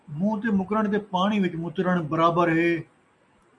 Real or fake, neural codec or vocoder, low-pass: real; none; 10.8 kHz